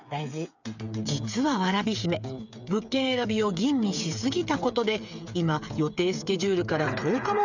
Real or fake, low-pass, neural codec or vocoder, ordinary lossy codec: fake; 7.2 kHz; codec, 16 kHz, 8 kbps, FreqCodec, smaller model; none